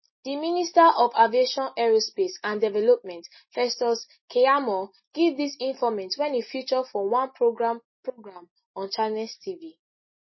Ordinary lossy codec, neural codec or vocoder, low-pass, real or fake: MP3, 24 kbps; none; 7.2 kHz; real